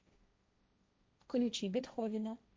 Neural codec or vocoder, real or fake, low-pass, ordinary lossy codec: codec, 16 kHz, 1.1 kbps, Voila-Tokenizer; fake; none; none